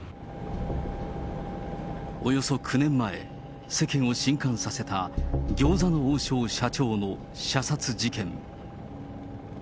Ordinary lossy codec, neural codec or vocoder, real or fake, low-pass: none; none; real; none